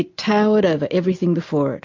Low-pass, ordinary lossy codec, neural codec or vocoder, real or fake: 7.2 kHz; MP3, 48 kbps; none; real